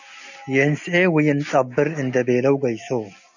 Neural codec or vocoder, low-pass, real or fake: none; 7.2 kHz; real